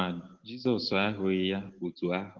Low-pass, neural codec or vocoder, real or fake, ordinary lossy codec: 7.2 kHz; none; real; Opus, 16 kbps